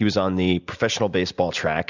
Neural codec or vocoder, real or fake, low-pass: none; real; 7.2 kHz